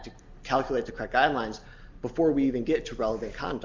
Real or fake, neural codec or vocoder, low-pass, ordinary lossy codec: real; none; 7.2 kHz; Opus, 32 kbps